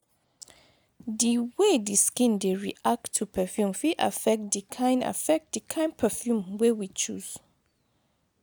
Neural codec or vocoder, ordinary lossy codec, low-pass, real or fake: none; none; none; real